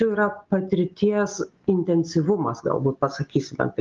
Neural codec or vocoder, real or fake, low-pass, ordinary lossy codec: none; real; 7.2 kHz; Opus, 32 kbps